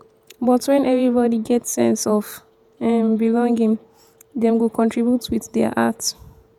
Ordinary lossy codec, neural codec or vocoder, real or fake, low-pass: none; vocoder, 48 kHz, 128 mel bands, Vocos; fake; 19.8 kHz